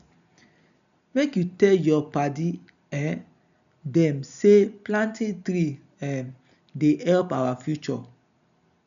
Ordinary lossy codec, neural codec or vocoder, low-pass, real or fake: none; none; 7.2 kHz; real